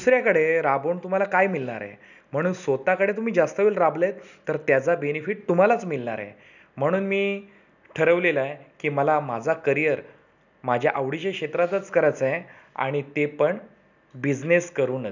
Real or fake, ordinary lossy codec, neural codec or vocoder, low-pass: real; none; none; 7.2 kHz